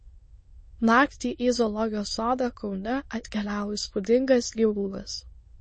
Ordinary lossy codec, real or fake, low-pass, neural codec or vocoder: MP3, 32 kbps; fake; 9.9 kHz; autoencoder, 22.05 kHz, a latent of 192 numbers a frame, VITS, trained on many speakers